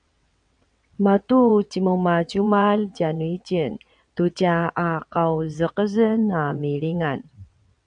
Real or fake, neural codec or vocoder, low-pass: fake; vocoder, 22.05 kHz, 80 mel bands, WaveNeXt; 9.9 kHz